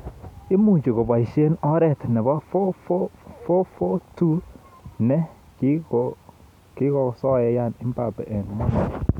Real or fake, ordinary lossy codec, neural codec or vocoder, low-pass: real; none; none; 19.8 kHz